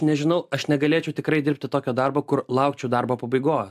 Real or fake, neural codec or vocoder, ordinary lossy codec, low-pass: real; none; AAC, 96 kbps; 14.4 kHz